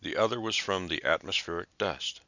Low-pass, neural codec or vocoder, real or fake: 7.2 kHz; none; real